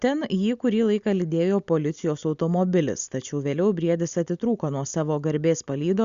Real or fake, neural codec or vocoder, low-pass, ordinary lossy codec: real; none; 7.2 kHz; Opus, 64 kbps